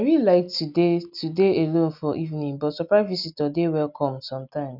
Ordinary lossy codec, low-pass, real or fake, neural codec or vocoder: none; 5.4 kHz; real; none